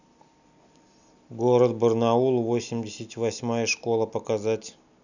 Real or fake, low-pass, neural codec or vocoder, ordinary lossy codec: real; 7.2 kHz; none; none